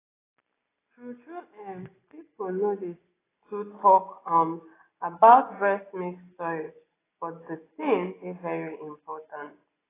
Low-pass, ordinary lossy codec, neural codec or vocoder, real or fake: 3.6 kHz; AAC, 16 kbps; none; real